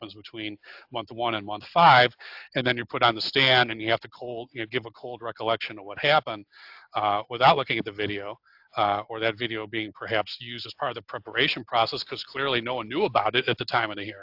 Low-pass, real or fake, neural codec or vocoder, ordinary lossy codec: 5.4 kHz; real; none; AAC, 48 kbps